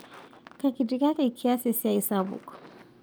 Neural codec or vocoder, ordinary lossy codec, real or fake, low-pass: none; none; real; none